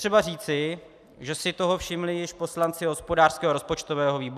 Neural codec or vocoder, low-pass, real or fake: none; 14.4 kHz; real